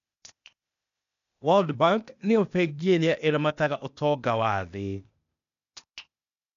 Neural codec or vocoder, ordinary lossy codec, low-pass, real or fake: codec, 16 kHz, 0.8 kbps, ZipCodec; none; 7.2 kHz; fake